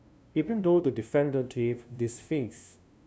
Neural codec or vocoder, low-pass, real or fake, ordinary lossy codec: codec, 16 kHz, 0.5 kbps, FunCodec, trained on LibriTTS, 25 frames a second; none; fake; none